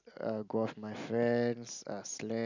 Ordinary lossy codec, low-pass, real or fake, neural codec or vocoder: none; 7.2 kHz; real; none